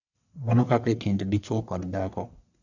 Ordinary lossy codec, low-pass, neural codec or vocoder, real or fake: none; 7.2 kHz; codec, 44.1 kHz, 1.7 kbps, Pupu-Codec; fake